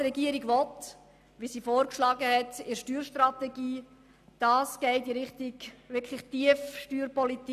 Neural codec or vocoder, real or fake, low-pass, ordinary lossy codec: none; real; 14.4 kHz; none